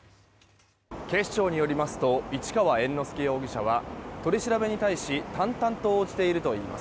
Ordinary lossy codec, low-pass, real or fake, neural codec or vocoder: none; none; real; none